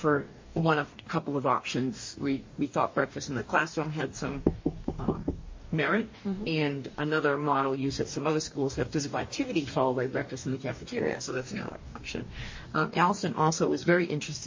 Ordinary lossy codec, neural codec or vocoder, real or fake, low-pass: MP3, 32 kbps; codec, 44.1 kHz, 2.6 kbps, DAC; fake; 7.2 kHz